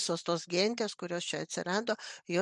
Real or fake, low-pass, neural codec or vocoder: real; 10.8 kHz; none